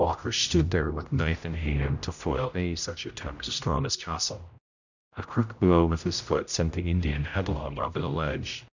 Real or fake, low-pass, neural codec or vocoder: fake; 7.2 kHz; codec, 16 kHz, 0.5 kbps, X-Codec, HuBERT features, trained on general audio